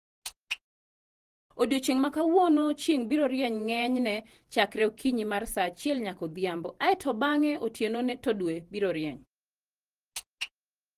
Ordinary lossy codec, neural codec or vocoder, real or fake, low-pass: Opus, 24 kbps; vocoder, 48 kHz, 128 mel bands, Vocos; fake; 14.4 kHz